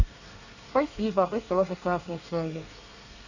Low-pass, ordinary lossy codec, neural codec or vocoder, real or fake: 7.2 kHz; none; codec, 24 kHz, 1 kbps, SNAC; fake